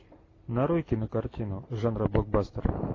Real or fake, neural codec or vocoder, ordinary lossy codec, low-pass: real; none; AAC, 32 kbps; 7.2 kHz